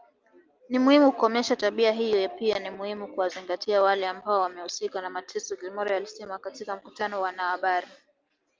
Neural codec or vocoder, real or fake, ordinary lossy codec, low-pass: none; real; Opus, 24 kbps; 7.2 kHz